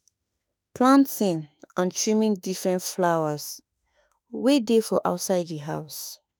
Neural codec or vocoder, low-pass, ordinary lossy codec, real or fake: autoencoder, 48 kHz, 32 numbers a frame, DAC-VAE, trained on Japanese speech; none; none; fake